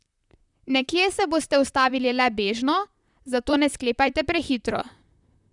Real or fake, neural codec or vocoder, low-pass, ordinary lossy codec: fake; vocoder, 44.1 kHz, 128 mel bands, Pupu-Vocoder; 10.8 kHz; none